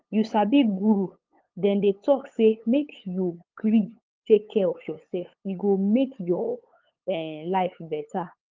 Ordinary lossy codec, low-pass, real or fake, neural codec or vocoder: Opus, 24 kbps; 7.2 kHz; fake; codec, 16 kHz, 8 kbps, FunCodec, trained on LibriTTS, 25 frames a second